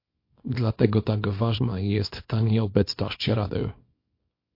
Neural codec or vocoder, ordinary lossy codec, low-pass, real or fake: codec, 24 kHz, 0.9 kbps, WavTokenizer, small release; MP3, 32 kbps; 5.4 kHz; fake